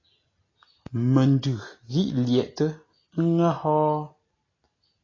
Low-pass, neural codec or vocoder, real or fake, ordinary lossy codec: 7.2 kHz; none; real; AAC, 32 kbps